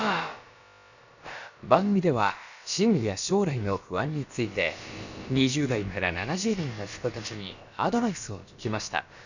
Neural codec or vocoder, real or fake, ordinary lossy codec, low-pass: codec, 16 kHz, about 1 kbps, DyCAST, with the encoder's durations; fake; none; 7.2 kHz